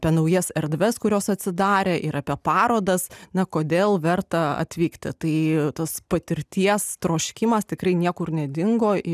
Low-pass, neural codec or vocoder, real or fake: 14.4 kHz; none; real